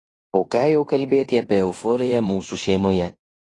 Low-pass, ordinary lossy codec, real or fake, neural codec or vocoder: 10.8 kHz; AAC, 32 kbps; fake; codec, 16 kHz in and 24 kHz out, 0.9 kbps, LongCat-Audio-Codec, fine tuned four codebook decoder